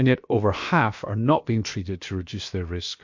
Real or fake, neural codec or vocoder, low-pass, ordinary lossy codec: fake; codec, 16 kHz, about 1 kbps, DyCAST, with the encoder's durations; 7.2 kHz; MP3, 48 kbps